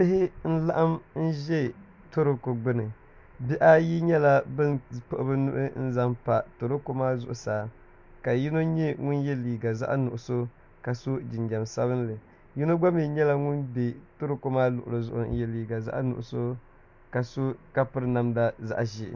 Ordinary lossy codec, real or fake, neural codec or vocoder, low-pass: MP3, 64 kbps; real; none; 7.2 kHz